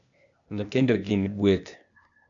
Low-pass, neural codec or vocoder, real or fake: 7.2 kHz; codec, 16 kHz, 0.8 kbps, ZipCodec; fake